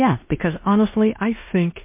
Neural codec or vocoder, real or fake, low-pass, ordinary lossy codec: codec, 16 kHz in and 24 kHz out, 0.9 kbps, LongCat-Audio-Codec, four codebook decoder; fake; 3.6 kHz; MP3, 24 kbps